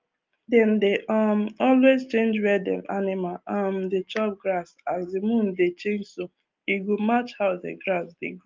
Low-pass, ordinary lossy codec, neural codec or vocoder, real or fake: 7.2 kHz; Opus, 24 kbps; none; real